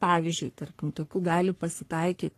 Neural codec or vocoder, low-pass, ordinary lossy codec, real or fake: codec, 44.1 kHz, 3.4 kbps, Pupu-Codec; 14.4 kHz; AAC, 48 kbps; fake